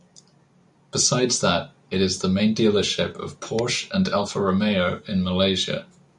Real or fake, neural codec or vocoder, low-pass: real; none; 10.8 kHz